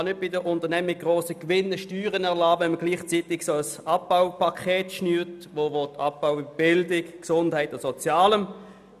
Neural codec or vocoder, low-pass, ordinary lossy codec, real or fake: none; 14.4 kHz; none; real